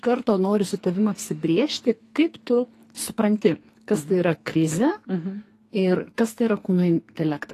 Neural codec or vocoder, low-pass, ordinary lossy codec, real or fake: codec, 44.1 kHz, 2.6 kbps, SNAC; 14.4 kHz; AAC, 48 kbps; fake